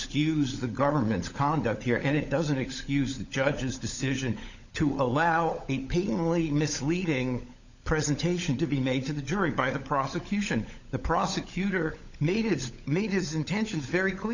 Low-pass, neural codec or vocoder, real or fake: 7.2 kHz; codec, 16 kHz, 16 kbps, FunCodec, trained on LibriTTS, 50 frames a second; fake